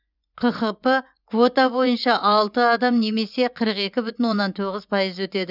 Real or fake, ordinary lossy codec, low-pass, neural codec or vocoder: fake; none; 5.4 kHz; vocoder, 24 kHz, 100 mel bands, Vocos